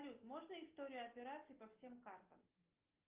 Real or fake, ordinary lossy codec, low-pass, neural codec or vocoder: real; Opus, 24 kbps; 3.6 kHz; none